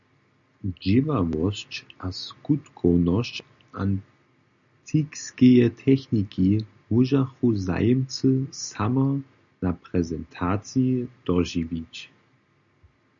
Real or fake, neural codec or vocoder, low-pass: real; none; 7.2 kHz